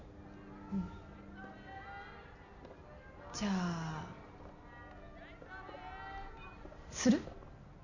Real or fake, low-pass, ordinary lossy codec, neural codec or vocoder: real; 7.2 kHz; none; none